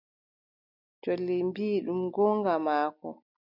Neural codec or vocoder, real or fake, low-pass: none; real; 5.4 kHz